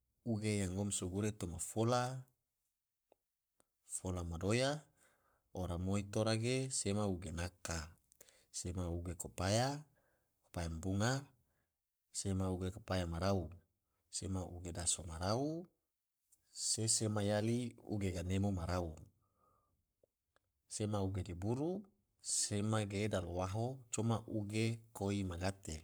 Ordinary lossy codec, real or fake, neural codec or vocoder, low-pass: none; fake; codec, 44.1 kHz, 7.8 kbps, Pupu-Codec; none